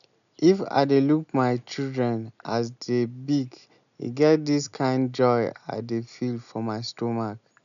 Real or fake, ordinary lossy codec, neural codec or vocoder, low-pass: real; none; none; 7.2 kHz